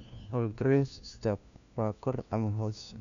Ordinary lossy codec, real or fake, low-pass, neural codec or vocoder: none; fake; 7.2 kHz; codec, 16 kHz, 1 kbps, FunCodec, trained on LibriTTS, 50 frames a second